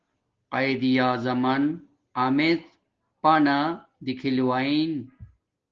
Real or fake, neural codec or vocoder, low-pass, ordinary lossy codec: real; none; 7.2 kHz; Opus, 16 kbps